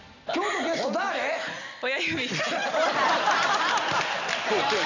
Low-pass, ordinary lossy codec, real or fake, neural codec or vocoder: 7.2 kHz; none; real; none